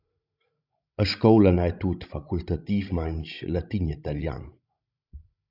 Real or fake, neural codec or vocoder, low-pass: fake; codec, 16 kHz, 16 kbps, FreqCodec, larger model; 5.4 kHz